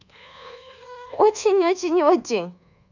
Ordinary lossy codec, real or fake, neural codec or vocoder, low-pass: none; fake; codec, 24 kHz, 1.2 kbps, DualCodec; 7.2 kHz